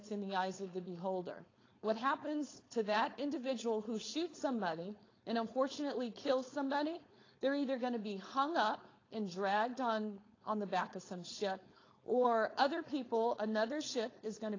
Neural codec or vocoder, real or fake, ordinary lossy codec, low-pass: codec, 16 kHz, 4.8 kbps, FACodec; fake; AAC, 32 kbps; 7.2 kHz